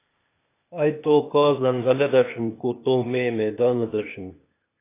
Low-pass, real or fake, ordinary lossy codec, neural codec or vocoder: 3.6 kHz; fake; AAC, 24 kbps; codec, 16 kHz, 0.8 kbps, ZipCodec